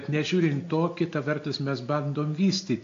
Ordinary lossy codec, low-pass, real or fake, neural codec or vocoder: AAC, 48 kbps; 7.2 kHz; real; none